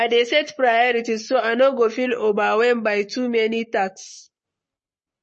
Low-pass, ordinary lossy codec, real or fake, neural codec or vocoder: 10.8 kHz; MP3, 32 kbps; fake; codec, 44.1 kHz, 7.8 kbps, Pupu-Codec